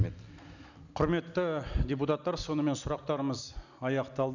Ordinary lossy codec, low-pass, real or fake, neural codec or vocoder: none; 7.2 kHz; real; none